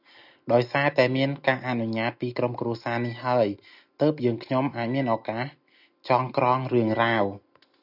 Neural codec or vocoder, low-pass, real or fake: none; 5.4 kHz; real